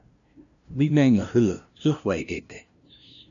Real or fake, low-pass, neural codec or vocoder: fake; 7.2 kHz; codec, 16 kHz, 0.5 kbps, FunCodec, trained on LibriTTS, 25 frames a second